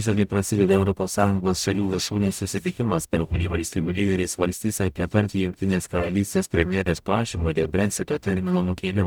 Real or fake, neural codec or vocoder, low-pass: fake; codec, 44.1 kHz, 0.9 kbps, DAC; 19.8 kHz